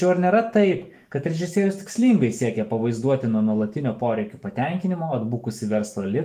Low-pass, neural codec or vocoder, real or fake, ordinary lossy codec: 14.4 kHz; autoencoder, 48 kHz, 128 numbers a frame, DAC-VAE, trained on Japanese speech; fake; Opus, 32 kbps